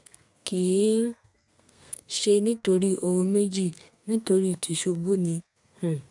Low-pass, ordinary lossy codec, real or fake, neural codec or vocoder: 10.8 kHz; none; fake; codec, 32 kHz, 1.9 kbps, SNAC